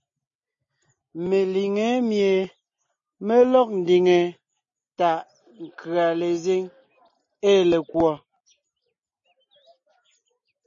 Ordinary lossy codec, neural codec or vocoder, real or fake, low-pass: MP3, 32 kbps; none; real; 7.2 kHz